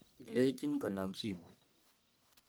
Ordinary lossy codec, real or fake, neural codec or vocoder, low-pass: none; fake; codec, 44.1 kHz, 1.7 kbps, Pupu-Codec; none